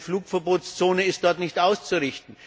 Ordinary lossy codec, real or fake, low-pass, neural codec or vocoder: none; real; none; none